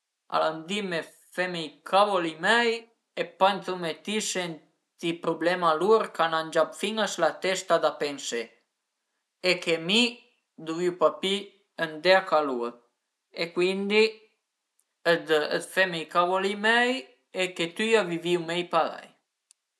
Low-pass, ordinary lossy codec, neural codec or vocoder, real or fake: none; none; none; real